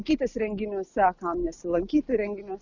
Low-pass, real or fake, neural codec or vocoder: 7.2 kHz; real; none